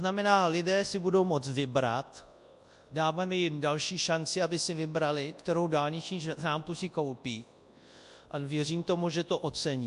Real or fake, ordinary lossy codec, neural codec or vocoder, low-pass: fake; AAC, 64 kbps; codec, 24 kHz, 0.9 kbps, WavTokenizer, large speech release; 10.8 kHz